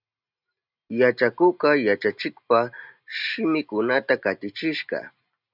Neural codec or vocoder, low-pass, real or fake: none; 5.4 kHz; real